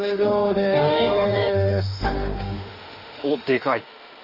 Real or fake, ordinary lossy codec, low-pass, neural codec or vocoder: fake; Opus, 32 kbps; 5.4 kHz; autoencoder, 48 kHz, 32 numbers a frame, DAC-VAE, trained on Japanese speech